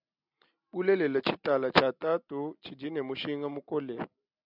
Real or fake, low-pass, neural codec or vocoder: real; 5.4 kHz; none